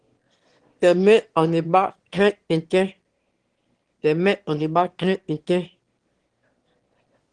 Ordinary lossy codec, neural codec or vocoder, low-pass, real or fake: Opus, 16 kbps; autoencoder, 22.05 kHz, a latent of 192 numbers a frame, VITS, trained on one speaker; 9.9 kHz; fake